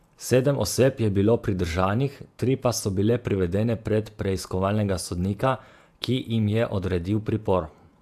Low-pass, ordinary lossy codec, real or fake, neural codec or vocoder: 14.4 kHz; AAC, 96 kbps; fake; vocoder, 48 kHz, 128 mel bands, Vocos